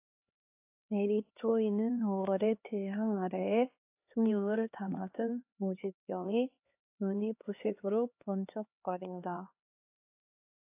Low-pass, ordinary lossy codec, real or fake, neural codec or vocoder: 3.6 kHz; AAC, 32 kbps; fake; codec, 16 kHz, 2 kbps, X-Codec, HuBERT features, trained on LibriSpeech